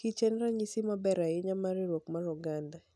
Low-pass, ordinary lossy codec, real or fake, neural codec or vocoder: none; none; real; none